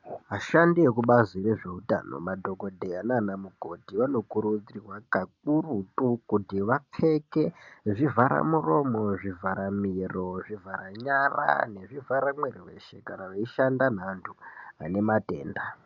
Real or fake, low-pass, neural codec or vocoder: real; 7.2 kHz; none